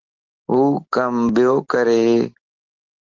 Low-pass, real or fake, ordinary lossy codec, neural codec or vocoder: 7.2 kHz; real; Opus, 16 kbps; none